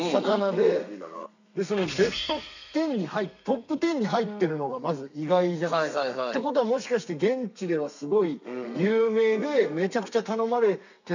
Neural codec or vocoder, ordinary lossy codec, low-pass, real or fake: codec, 32 kHz, 1.9 kbps, SNAC; none; 7.2 kHz; fake